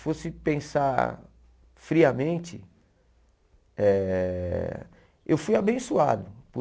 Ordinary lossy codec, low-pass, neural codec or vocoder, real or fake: none; none; none; real